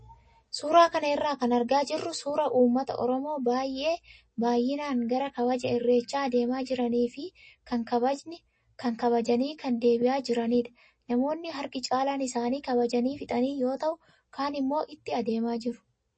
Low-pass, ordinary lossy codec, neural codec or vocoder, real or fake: 9.9 kHz; MP3, 32 kbps; none; real